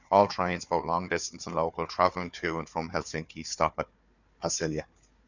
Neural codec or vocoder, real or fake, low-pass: codec, 16 kHz, 4 kbps, FunCodec, trained on Chinese and English, 50 frames a second; fake; 7.2 kHz